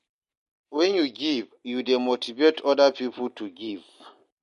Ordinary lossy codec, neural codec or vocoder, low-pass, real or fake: MP3, 48 kbps; none; 14.4 kHz; real